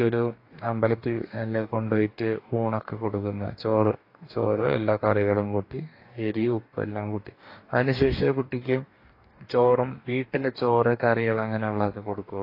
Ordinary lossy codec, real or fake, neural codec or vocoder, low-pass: AAC, 32 kbps; fake; codec, 44.1 kHz, 2.6 kbps, DAC; 5.4 kHz